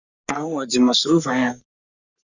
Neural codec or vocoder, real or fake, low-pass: codec, 44.1 kHz, 3.4 kbps, Pupu-Codec; fake; 7.2 kHz